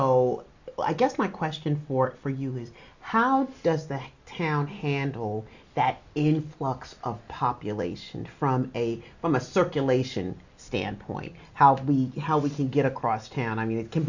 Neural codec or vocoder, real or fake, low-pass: none; real; 7.2 kHz